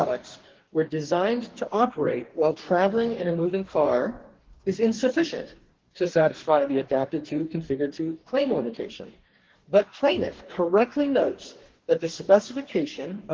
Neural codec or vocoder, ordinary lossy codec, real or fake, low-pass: codec, 44.1 kHz, 2.6 kbps, DAC; Opus, 16 kbps; fake; 7.2 kHz